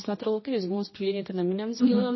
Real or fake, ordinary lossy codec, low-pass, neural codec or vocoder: fake; MP3, 24 kbps; 7.2 kHz; codec, 16 kHz, 1 kbps, X-Codec, HuBERT features, trained on general audio